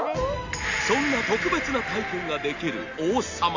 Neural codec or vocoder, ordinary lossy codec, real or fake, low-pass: none; AAC, 48 kbps; real; 7.2 kHz